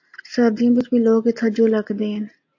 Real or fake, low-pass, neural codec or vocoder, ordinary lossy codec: real; 7.2 kHz; none; AAC, 48 kbps